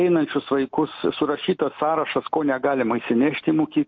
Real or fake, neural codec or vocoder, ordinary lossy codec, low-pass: real; none; MP3, 48 kbps; 7.2 kHz